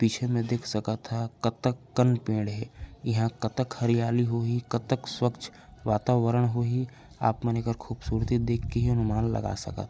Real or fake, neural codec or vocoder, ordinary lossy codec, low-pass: real; none; none; none